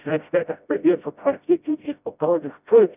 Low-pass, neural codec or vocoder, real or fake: 3.6 kHz; codec, 16 kHz, 0.5 kbps, FreqCodec, smaller model; fake